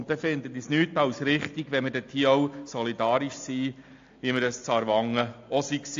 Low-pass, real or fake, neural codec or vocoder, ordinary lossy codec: 7.2 kHz; real; none; AAC, 48 kbps